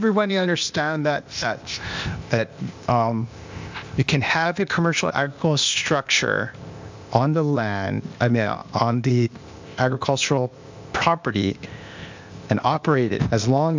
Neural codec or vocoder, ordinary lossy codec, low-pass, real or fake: codec, 16 kHz, 0.8 kbps, ZipCodec; MP3, 64 kbps; 7.2 kHz; fake